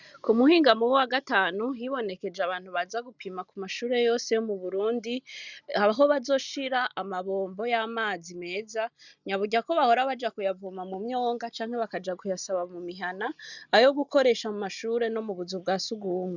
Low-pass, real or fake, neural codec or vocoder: 7.2 kHz; real; none